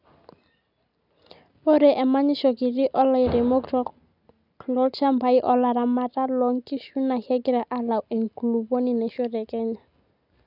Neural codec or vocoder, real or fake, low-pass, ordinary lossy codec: none; real; 5.4 kHz; none